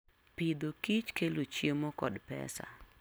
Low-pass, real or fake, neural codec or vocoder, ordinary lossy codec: none; real; none; none